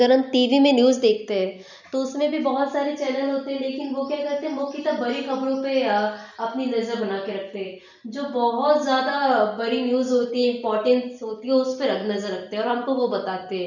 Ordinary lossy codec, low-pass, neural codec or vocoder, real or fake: none; 7.2 kHz; none; real